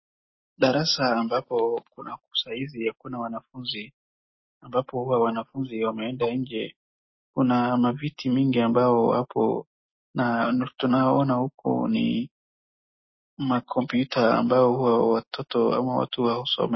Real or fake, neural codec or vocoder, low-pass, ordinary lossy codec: real; none; 7.2 kHz; MP3, 24 kbps